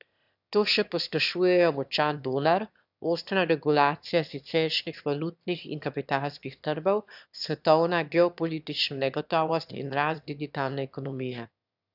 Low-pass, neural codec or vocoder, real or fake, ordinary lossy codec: 5.4 kHz; autoencoder, 22.05 kHz, a latent of 192 numbers a frame, VITS, trained on one speaker; fake; none